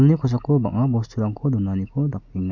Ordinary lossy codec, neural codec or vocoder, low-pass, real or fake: none; none; 7.2 kHz; real